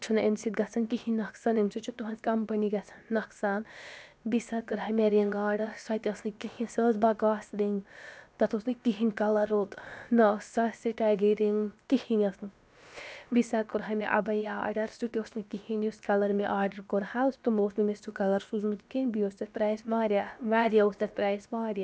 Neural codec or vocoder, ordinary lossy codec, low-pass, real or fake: codec, 16 kHz, about 1 kbps, DyCAST, with the encoder's durations; none; none; fake